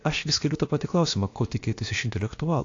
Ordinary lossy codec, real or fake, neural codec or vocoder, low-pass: AAC, 48 kbps; fake; codec, 16 kHz, about 1 kbps, DyCAST, with the encoder's durations; 7.2 kHz